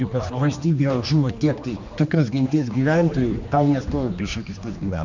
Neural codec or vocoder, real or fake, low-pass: codec, 16 kHz, 2 kbps, X-Codec, HuBERT features, trained on general audio; fake; 7.2 kHz